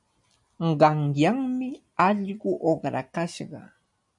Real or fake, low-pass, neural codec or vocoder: real; 10.8 kHz; none